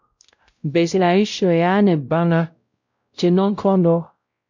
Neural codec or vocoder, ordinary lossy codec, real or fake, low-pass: codec, 16 kHz, 0.5 kbps, X-Codec, WavLM features, trained on Multilingual LibriSpeech; MP3, 64 kbps; fake; 7.2 kHz